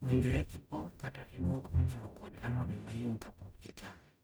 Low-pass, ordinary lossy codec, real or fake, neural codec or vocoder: none; none; fake; codec, 44.1 kHz, 0.9 kbps, DAC